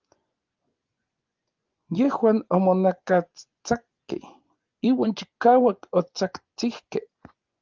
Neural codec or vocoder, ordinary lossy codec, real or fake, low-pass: vocoder, 24 kHz, 100 mel bands, Vocos; Opus, 32 kbps; fake; 7.2 kHz